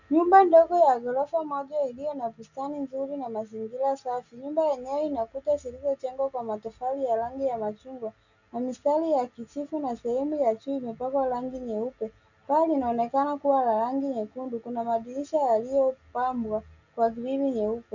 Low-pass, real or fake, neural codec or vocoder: 7.2 kHz; real; none